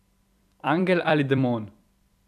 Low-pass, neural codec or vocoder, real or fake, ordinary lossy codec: 14.4 kHz; vocoder, 44.1 kHz, 128 mel bands every 256 samples, BigVGAN v2; fake; none